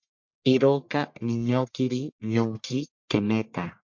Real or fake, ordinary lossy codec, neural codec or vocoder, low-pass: fake; MP3, 48 kbps; codec, 44.1 kHz, 1.7 kbps, Pupu-Codec; 7.2 kHz